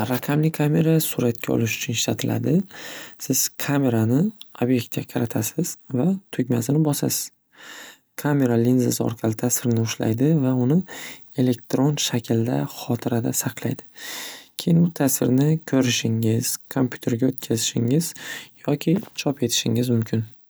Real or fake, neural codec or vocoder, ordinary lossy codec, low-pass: real; none; none; none